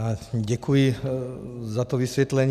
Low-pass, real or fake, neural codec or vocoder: 14.4 kHz; real; none